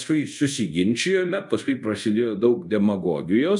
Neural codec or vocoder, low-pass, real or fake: codec, 24 kHz, 0.5 kbps, DualCodec; 10.8 kHz; fake